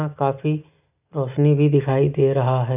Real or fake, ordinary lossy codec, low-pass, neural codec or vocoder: real; none; 3.6 kHz; none